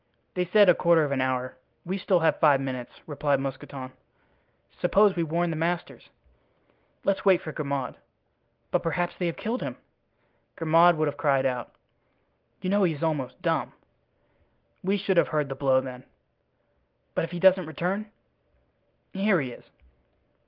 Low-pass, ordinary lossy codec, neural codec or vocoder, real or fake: 5.4 kHz; Opus, 32 kbps; none; real